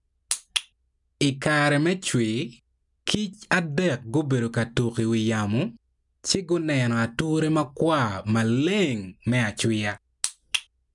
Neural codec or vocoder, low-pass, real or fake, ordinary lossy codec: none; 10.8 kHz; real; none